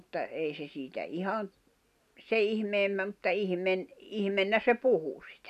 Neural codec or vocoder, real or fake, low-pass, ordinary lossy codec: none; real; 14.4 kHz; none